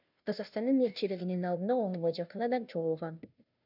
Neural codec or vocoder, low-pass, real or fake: codec, 16 kHz, 0.5 kbps, FunCodec, trained on Chinese and English, 25 frames a second; 5.4 kHz; fake